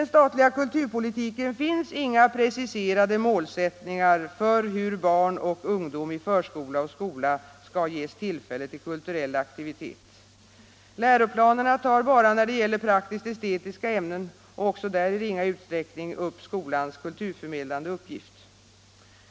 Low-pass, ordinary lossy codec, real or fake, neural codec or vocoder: none; none; real; none